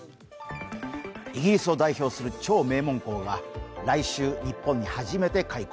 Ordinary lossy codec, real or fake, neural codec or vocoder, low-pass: none; real; none; none